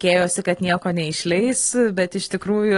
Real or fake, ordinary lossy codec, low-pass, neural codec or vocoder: real; AAC, 32 kbps; 10.8 kHz; none